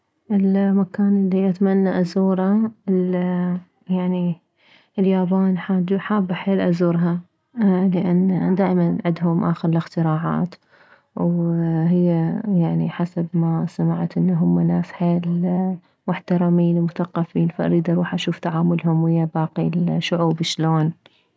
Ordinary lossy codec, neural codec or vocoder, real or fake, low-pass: none; none; real; none